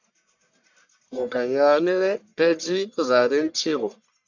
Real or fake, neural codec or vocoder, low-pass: fake; codec, 44.1 kHz, 1.7 kbps, Pupu-Codec; 7.2 kHz